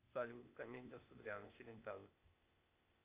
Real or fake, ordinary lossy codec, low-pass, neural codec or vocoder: fake; Opus, 64 kbps; 3.6 kHz; codec, 16 kHz, 0.8 kbps, ZipCodec